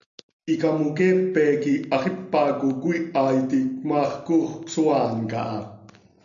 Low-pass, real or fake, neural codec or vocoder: 7.2 kHz; real; none